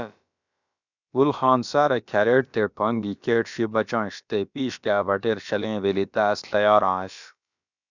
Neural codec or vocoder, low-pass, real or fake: codec, 16 kHz, about 1 kbps, DyCAST, with the encoder's durations; 7.2 kHz; fake